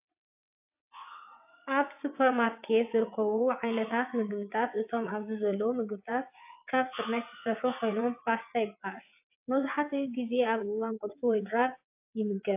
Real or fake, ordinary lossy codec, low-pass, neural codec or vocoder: fake; AAC, 32 kbps; 3.6 kHz; vocoder, 22.05 kHz, 80 mel bands, WaveNeXt